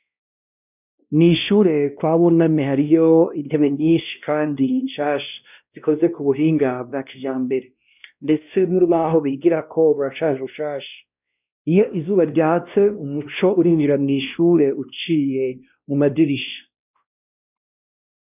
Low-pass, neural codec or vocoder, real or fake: 3.6 kHz; codec, 16 kHz, 1 kbps, X-Codec, WavLM features, trained on Multilingual LibriSpeech; fake